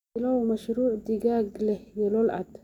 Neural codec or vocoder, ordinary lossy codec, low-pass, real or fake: none; none; 19.8 kHz; real